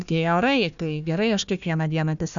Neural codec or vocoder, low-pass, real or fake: codec, 16 kHz, 1 kbps, FunCodec, trained on Chinese and English, 50 frames a second; 7.2 kHz; fake